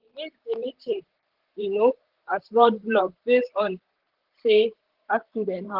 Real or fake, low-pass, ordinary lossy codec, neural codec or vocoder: fake; 5.4 kHz; Opus, 16 kbps; vocoder, 44.1 kHz, 128 mel bands, Pupu-Vocoder